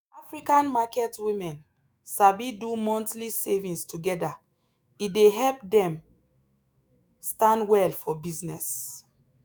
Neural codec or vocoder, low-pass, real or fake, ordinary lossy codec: autoencoder, 48 kHz, 128 numbers a frame, DAC-VAE, trained on Japanese speech; none; fake; none